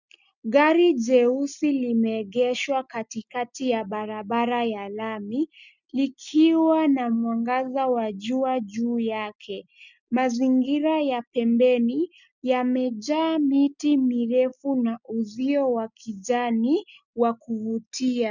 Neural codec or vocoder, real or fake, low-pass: none; real; 7.2 kHz